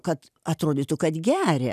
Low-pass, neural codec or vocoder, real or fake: 14.4 kHz; none; real